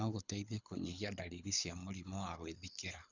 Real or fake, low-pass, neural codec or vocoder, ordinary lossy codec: fake; 7.2 kHz; codec, 24 kHz, 6 kbps, HILCodec; none